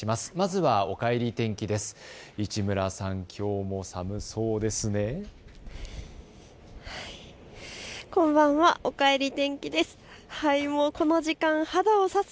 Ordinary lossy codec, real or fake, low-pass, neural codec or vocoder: none; real; none; none